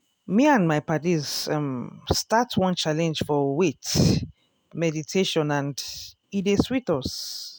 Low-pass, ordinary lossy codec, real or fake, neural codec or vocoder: none; none; real; none